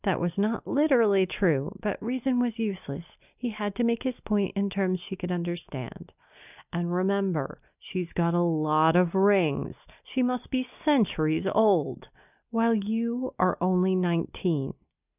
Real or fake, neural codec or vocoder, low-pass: real; none; 3.6 kHz